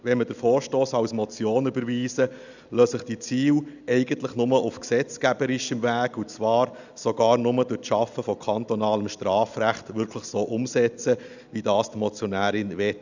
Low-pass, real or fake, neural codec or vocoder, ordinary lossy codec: 7.2 kHz; real; none; none